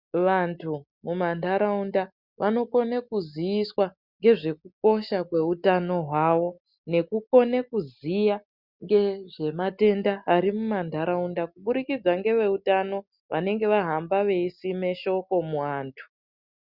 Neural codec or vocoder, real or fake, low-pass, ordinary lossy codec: none; real; 5.4 kHz; Opus, 64 kbps